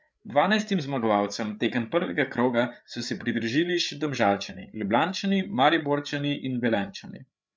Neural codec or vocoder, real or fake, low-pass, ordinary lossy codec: codec, 16 kHz, 8 kbps, FreqCodec, larger model; fake; none; none